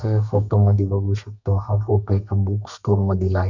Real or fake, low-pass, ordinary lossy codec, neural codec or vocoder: fake; 7.2 kHz; none; codec, 32 kHz, 1.9 kbps, SNAC